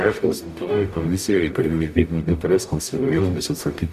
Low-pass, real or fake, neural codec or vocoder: 14.4 kHz; fake; codec, 44.1 kHz, 0.9 kbps, DAC